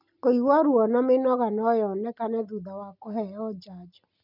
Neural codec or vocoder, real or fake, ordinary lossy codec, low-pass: none; real; none; 5.4 kHz